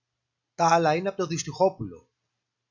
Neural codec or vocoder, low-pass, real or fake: none; 7.2 kHz; real